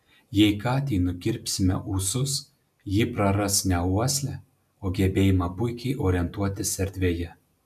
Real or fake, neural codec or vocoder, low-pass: real; none; 14.4 kHz